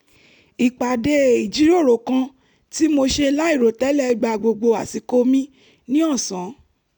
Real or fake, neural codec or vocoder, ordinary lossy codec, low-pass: real; none; none; 19.8 kHz